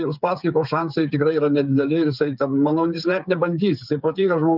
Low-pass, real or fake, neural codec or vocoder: 5.4 kHz; fake; codec, 16 kHz, 8 kbps, FreqCodec, smaller model